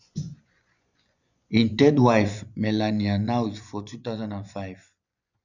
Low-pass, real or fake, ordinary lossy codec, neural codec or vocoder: 7.2 kHz; fake; none; vocoder, 24 kHz, 100 mel bands, Vocos